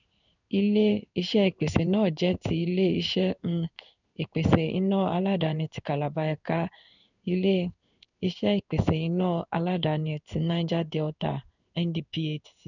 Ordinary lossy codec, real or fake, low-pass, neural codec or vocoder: none; fake; 7.2 kHz; codec, 16 kHz in and 24 kHz out, 1 kbps, XY-Tokenizer